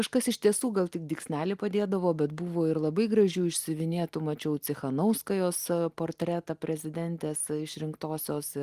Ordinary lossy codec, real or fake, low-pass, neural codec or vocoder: Opus, 24 kbps; real; 14.4 kHz; none